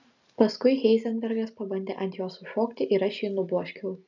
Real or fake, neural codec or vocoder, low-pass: real; none; 7.2 kHz